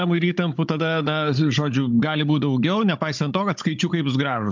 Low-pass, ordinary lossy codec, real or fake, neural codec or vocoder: 7.2 kHz; MP3, 64 kbps; fake; codec, 16 kHz, 16 kbps, FunCodec, trained on Chinese and English, 50 frames a second